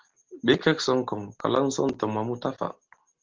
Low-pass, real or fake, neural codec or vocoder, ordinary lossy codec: 7.2 kHz; real; none; Opus, 16 kbps